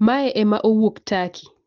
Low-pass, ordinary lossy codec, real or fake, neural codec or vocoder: 7.2 kHz; Opus, 16 kbps; real; none